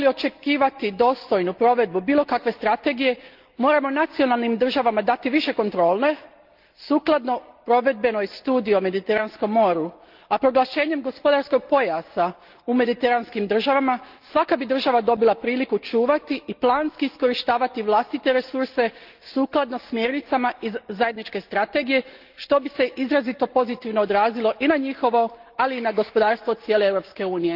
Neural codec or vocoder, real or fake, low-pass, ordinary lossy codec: none; real; 5.4 kHz; Opus, 32 kbps